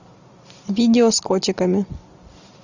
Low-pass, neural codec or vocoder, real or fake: 7.2 kHz; none; real